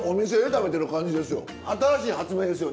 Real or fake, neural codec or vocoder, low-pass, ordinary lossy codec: real; none; none; none